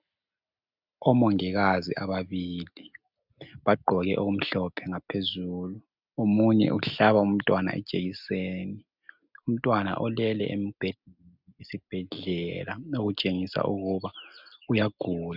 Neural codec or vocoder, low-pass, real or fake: none; 5.4 kHz; real